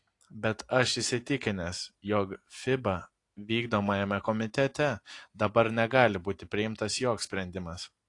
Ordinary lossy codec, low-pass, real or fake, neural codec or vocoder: AAC, 48 kbps; 10.8 kHz; real; none